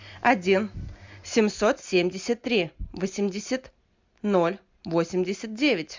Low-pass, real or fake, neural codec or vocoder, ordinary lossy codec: 7.2 kHz; real; none; MP3, 64 kbps